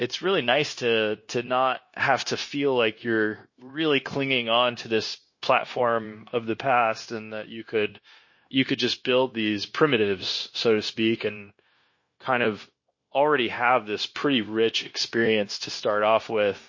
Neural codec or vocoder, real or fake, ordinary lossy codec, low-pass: codec, 16 kHz, 0.9 kbps, LongCat-Audio-Codec; fake; MP3, 32 kbps; 7.2 kHz